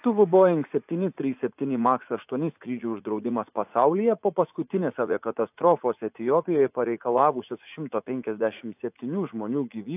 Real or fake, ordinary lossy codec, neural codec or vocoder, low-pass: fake; AAC, 32 kbps; vocoder, 44.1 kHz, 80 mel bands, Vocos; 3.6 kHz